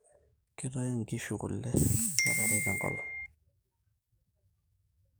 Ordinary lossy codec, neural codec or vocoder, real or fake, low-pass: none; codec, 44.1 kHz, 7.8 kbps, DAC; fake; none